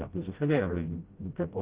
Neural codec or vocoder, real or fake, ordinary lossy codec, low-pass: codec, 16 kHz, 0.5 kbps, FreqCodec, smaller model; fake; Opus, 16 kbps; 3.6 kHz